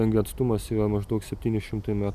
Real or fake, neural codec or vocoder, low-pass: real; none; 14.4 kHz